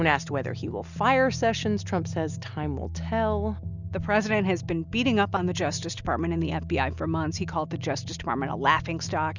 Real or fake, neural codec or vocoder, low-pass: real; none; 7.2 kHz